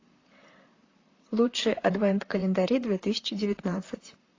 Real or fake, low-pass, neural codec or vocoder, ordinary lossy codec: fake; 7.2 kHz; vocoder, 44.1 kHz, 128 mel bands, Pupu-Vocoder; AAC, 32 kbps